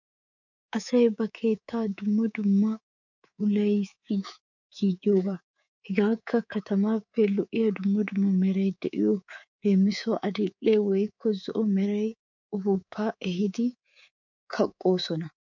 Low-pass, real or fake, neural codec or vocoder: 7.2 kHz; fake; codec, 24 kHz, 3.1 kbps, DualCodec